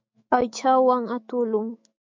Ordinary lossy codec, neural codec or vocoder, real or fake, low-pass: MP3, 48 kbps; autoencoder, 48 kHz, 128 numbers a frame, DAC-VAE, trained on Japanese speech; fake; 7.2 kHz